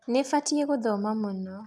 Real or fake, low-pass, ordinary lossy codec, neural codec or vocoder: real; none; none; none